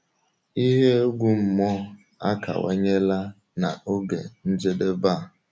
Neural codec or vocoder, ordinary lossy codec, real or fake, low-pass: none; none; real; none